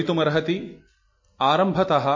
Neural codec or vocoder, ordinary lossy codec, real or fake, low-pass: none; MP3, 32 kbps; real; 7.2 kHz